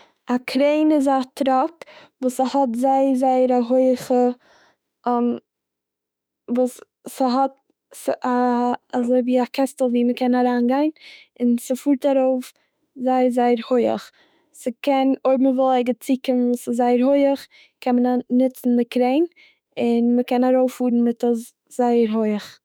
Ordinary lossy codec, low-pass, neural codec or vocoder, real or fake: none; none; autoencoder, 48 kHz, 32 numbers a frame, DAC-VAE, trained on Japanese speech; fake